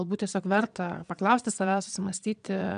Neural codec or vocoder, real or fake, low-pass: vocoder, 22.05 kHz, 80 mel bands, Vocos; fake; 9.9 kHz